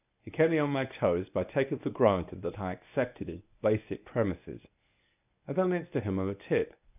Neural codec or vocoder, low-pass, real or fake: codec, 24 kHz, 0.9 kbps, WavTokenizer, medium speech release version 1; 3.6 kHz; fake